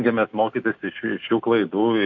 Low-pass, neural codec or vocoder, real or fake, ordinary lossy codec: 7.2 kHz; none; real; AAC, 48 kbps